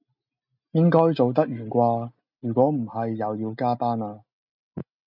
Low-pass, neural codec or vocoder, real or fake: 5.4 kHz; none; real